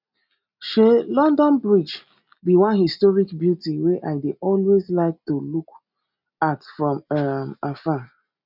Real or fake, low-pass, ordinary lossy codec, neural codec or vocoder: real; 5.4 kHz; none; none